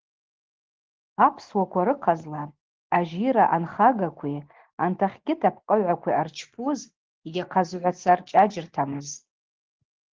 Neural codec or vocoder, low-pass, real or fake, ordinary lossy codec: none; 7.2 kHz; real; Opus, 16 kbps